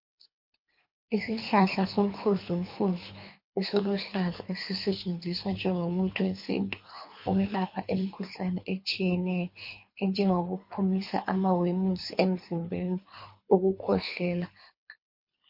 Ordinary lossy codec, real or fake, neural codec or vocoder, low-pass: MP3, 32 kbps; fake; codec, 24 kHz, 3 kbps, HILCodec; 5.4 kHz